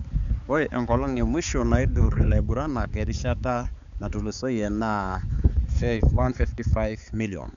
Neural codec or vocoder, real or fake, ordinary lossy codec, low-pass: codec, 16 kHz, 4 kbps, X-Codec, HuBERT features, trained on balanced general audio; fake; none; 7.2 kHz